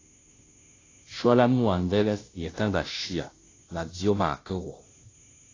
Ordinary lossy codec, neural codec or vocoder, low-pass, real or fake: AAC, 32 kbps; codec, 16 kHz, 0.5 kbps, FunCodec, trained on Chinese and English, 25 frames a second; 7.2 kHz; fake